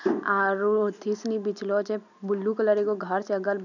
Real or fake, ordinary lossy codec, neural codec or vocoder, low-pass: real; none; none; 7.2 kHz